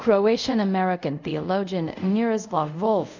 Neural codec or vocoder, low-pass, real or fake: codec, 24 kHz, 0.5 kbps, DualCodec; 7.2 kHz; fake